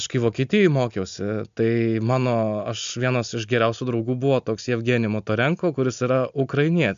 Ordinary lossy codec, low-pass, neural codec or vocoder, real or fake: MP3, 64 kbps; 7.2 kHz; none; real